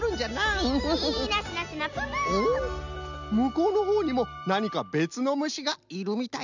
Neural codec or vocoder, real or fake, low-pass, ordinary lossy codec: none; real; 7.2 kHz; none